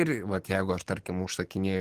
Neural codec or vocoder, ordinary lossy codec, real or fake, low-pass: codec, 44.1 kHz, 7.8 kbps, DAC; Opus, 16 kbps; fake; 14.4 kHz